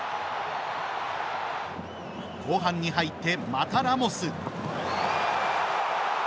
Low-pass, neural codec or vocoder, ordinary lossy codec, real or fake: none; none; none; real